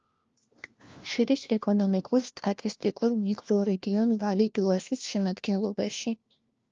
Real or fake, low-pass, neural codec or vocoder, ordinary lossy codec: fake; 7.2 kHz; codec, 16 kHz, 1 kbps, FunCodec, trained on LibriTTS, 50 frames a second; Opus, 24 kbps